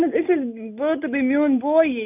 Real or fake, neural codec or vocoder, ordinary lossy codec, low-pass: real; none; none; 3.6 kHz